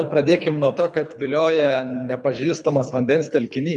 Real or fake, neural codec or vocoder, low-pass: fake; codec, 24 kHz, 3 kbps, HILCodec; 10.8 kHz